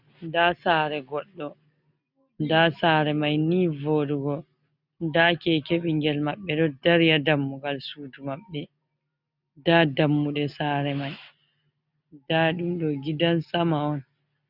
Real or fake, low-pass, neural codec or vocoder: real; 5.4 kHz; none